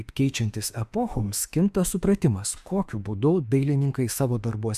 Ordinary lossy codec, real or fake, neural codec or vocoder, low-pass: Opus, 64 kbps; fake; autoencoder, 48 kHz, 32 numbers a frame, DAC-VAE, trained on Japanese speech; 14.4 kHz